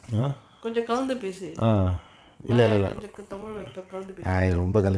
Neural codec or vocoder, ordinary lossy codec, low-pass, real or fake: vocoder, 22.05 kHz, 80 mel bands, WaveNeXt; none; none; fake